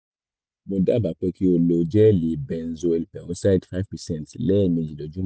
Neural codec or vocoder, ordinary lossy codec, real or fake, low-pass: none; none; real; none